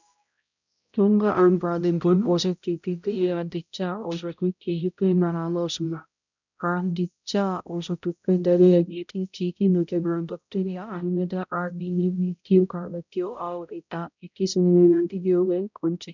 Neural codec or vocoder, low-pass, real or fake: codec, 16 kHz, 0.5 kbps, X-Codec, HuBERT features, trained on balanced general audio; 7.2 kHz; fake